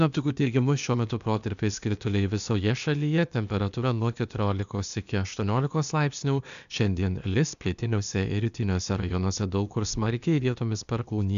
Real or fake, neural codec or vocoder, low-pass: fake; codec, 16 kHz, 0.8 kbps, ZipCodec; 7.2 kHz